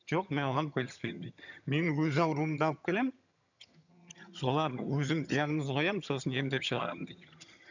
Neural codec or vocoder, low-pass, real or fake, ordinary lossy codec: vocoder, 22.05 kHz, 80 mel bands, HiFi-GAN; 7.2 kHz; fake; none